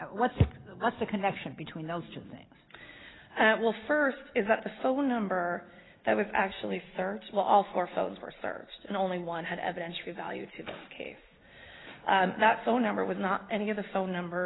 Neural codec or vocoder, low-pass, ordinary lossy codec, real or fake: none; 7.2 kHz; AAC, 16 kbps; real